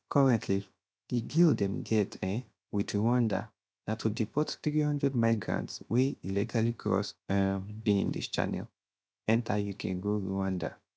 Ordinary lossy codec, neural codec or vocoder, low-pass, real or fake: none; codec, 16 kHz, 0.7 kbps, FocalCodec; none; fake